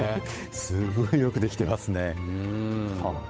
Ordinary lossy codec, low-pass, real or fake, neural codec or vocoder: none; none; fake; codec, 16 kHz, 8 kbps, FunCodec, trained on Chinese and English, 25 frames a second